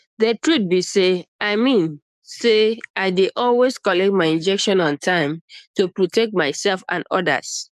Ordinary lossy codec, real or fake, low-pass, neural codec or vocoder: none; fake; 14.4 kHz; codec, 44.1 kHz, 7.8 kbps, DAC